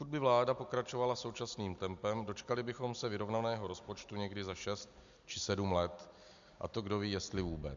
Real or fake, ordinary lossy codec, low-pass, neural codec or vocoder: real; MP3, 64 kbps; 7.2 kHz; none